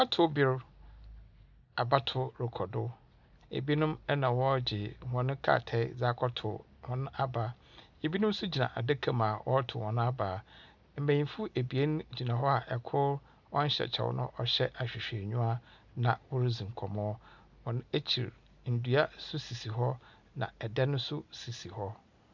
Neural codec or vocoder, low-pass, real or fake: none; 7.2 kHz; real